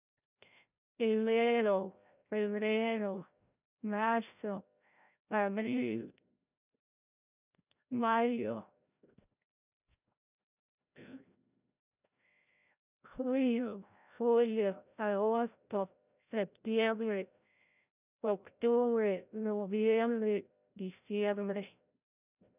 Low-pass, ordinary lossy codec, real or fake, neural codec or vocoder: 3.6 kHz; none; fake; codec, 16 kHz, 0.5 kbps, FreqCodec, larger model